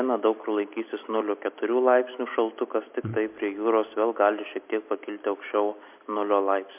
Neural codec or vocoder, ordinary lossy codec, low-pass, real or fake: none; MP3, 32 kbps; 3.6 kHz; real